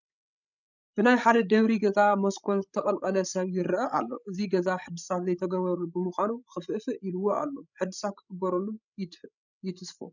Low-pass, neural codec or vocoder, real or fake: 7.2 kHz; codec, 16 kHz, 16 kbps, FreqCodec, larger model; fake